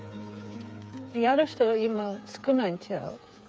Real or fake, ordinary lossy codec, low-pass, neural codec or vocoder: fake; none; none; codec, 16 kHz, 8 kbps, FreqCodec, smaller model